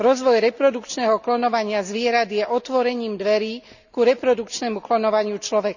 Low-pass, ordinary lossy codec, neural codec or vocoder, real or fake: 7.2 kHz; none; none; real